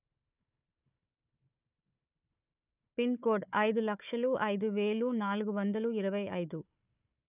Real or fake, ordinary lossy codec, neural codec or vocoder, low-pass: fake; none; codec, 16 kHz, 4 kbps, FunCodec, trained on Chinese and English, 50 frames a second; 3.6 kHz